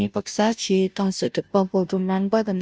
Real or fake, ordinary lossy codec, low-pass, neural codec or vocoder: fake; none; none; codec, 16 kHz, 0.5 kbps, FunCodec, trained on Chinese and English, 25 frames a second